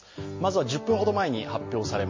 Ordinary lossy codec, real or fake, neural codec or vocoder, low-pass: MP3, 32 kbps; real; none; 7.2 kHz